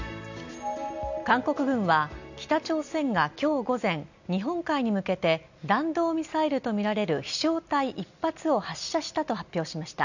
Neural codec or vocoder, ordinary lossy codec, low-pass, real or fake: none; none; 7.2 kHz; real